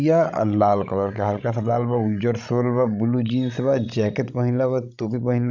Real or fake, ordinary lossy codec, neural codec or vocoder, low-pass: fake; none; codec, 16 kHz, 16 kbps, FreqCodec, larger model; 7.2 kHz